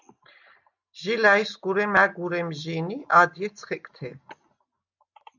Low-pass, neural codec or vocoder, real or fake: 7.2 kHz; none; real